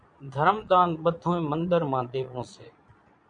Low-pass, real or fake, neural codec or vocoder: 9.9 kHz; fake; vocoder, 22.05 kHz, 80 mel bands, Vocos